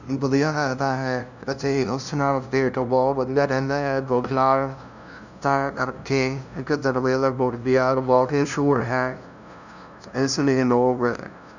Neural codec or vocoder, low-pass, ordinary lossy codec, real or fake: codec, 16 kHz, 0.5 kbps, FunCodec, trained on LibriTTS, 25 frames a second; 7.2 kHz; none; fake